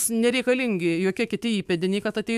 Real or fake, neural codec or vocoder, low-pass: fake; autoencoder, 48 kHz, 128 numbers a frame, DAC-VAE, trained on Japanese speech; 14.4 kHz